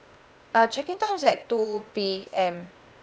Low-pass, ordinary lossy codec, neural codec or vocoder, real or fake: none; none; codec, 16 kHz, 0.8 kbps, ZipCodec; fake